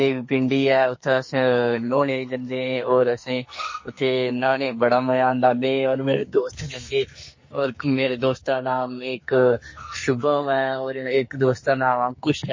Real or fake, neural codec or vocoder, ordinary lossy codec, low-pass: fake; codec, 44.1 kHz, 2.6 kbps, SNAC; MP3, 32 kbps; 7.2 kHz